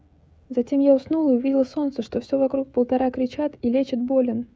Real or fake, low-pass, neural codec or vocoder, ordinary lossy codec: fake; none; codec, 16 kHz, 16 kbps, FreqCodec, smaller model; none